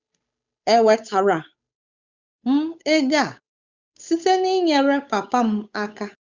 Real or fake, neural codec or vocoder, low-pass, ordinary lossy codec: fake; codec, 16 kHz, 8 kbps, FunCodec, trained on Chinese and English, 25 frames a second; 7.2 kHz; Opus, 64 kbps